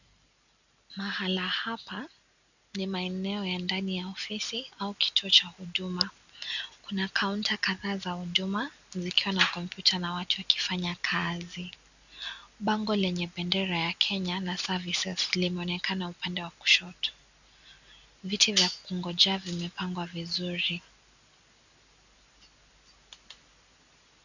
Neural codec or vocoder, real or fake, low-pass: none; real; 7.2 kHz